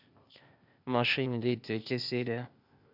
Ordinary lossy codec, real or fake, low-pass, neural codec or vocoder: none; fake; 5.4 kHz; codec, 16 kHz, 0.8 kbps, ZipCodec